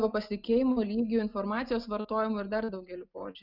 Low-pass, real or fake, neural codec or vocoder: 5.4 kHz; real; none